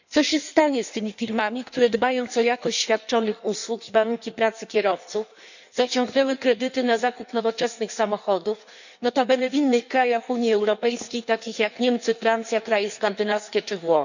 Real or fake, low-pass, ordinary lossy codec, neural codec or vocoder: fake; 7.2 kHz; none; codec, 16 kHz in and 24 kHz out, 1.1 kbps, FireRedTTS-2 codec